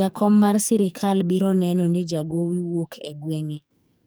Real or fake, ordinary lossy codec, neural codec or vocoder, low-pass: fake; none; codec, 44.1 kHz, 2.6 kbps, SNAC; none